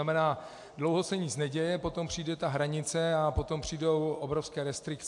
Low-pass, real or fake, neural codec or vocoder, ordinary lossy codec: 10.8 kHz; real; none; AAC, 64 kbps